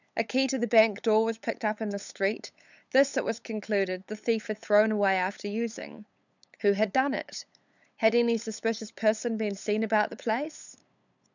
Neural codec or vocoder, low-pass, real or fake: codec, 16 kHz, 8 kbps, FunCodec, trained on LibriTTS, 25 frames a second; 7.2 kHz; fake